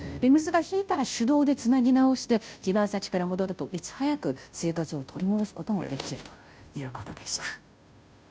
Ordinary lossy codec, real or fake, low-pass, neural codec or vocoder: none; fake; none; codec, 16 kHz, 0.5 kbps, FunCodec, trained on Chinese and English, 25 frames a second